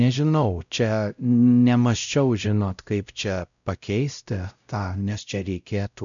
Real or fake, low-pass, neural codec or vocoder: fake; 7.2 kHz; codec, 16 kHz, 0.5 kbps, X-Codec, WavLM features, trained on Multilingual LibriSpeech